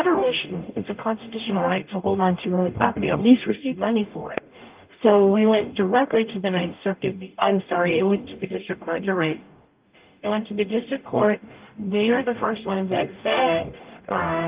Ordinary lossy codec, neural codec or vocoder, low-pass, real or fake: Opus, 24 kbps; codec, 44.1 kHz, 0.9 kbps, DAC; 3.6 kHz; fake